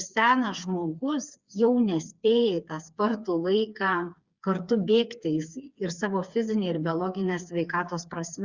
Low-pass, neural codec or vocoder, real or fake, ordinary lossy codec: 7.2 kHz; codec, 16 kHz, 4 kbps, FreqCodec, smaller model; fake; Opus, 64 kbps